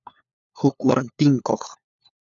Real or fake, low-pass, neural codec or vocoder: fake; 7.2 kHz; codec, 16 kHz, 4 kbps, FunCodec, trained on LibriTTS, 50 frames a second